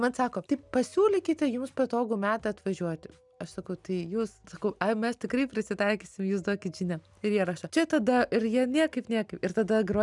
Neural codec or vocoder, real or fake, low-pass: none; real; 10.8 kHz